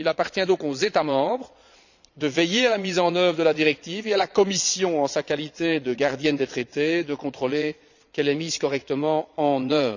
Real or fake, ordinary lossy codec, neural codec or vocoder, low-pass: fake; none; vocoder, 22.05 kHz, 80 mel bands, Vocos; 7.2 kHz